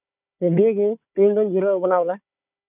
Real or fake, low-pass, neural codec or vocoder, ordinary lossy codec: fake; 3.6 kHz; codec, 16 kHz, 4 kbps, FunCodec, trained on Chinese and English, 50 frames a second; none